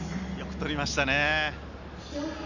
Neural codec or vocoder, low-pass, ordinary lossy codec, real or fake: none; 7.2 kHz; none; real